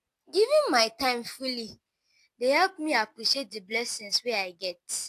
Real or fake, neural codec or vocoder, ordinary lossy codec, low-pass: real; none; AAC, 64 kbps; 14.4 kHz